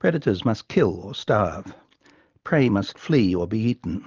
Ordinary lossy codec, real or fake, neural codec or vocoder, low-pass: Opus, 24 kbps; real; none; 7.2 kHz